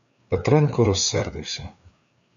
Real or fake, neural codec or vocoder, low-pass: fake; codec, 16 kHz, 4 kbps, FreqCodec, larger model; 7.2 kHz